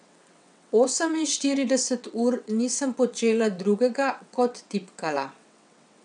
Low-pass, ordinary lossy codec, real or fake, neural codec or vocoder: 9.9 kHz; none; fake; vocoder, 22.05 kHz, 80 mel bands, WaveNeXt